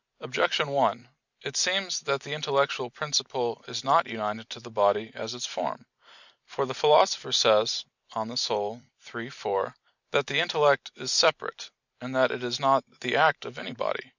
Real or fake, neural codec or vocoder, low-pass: real; none; 7.2 kHz